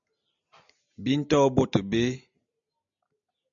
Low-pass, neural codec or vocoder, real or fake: 7.2 kHz; none; real